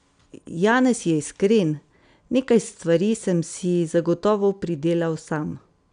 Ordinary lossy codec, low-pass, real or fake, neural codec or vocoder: none; 9.9 kHz; real; none